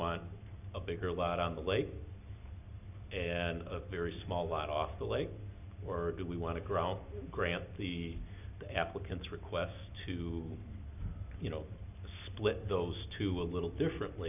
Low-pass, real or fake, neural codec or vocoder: 3.6 kHz; real; none